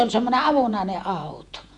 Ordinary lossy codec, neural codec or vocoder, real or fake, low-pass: none; vocoder, 48 kHz, 128 mel bands, Vocos; fake; 10.8 kHz